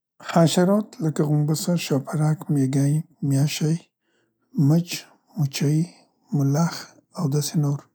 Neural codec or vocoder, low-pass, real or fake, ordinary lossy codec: none; none; real; none